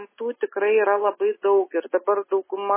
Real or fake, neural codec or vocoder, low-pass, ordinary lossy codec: real; none; 3.6 kHz; MP3, 16 kbps